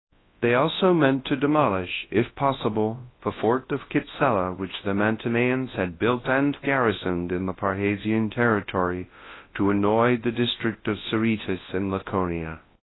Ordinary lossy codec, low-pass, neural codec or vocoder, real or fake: AAC, 16 kbps; 7.2 kHz; codec, 24 kHz, 0.9 kbps, WavTokenizer, large speech release; fake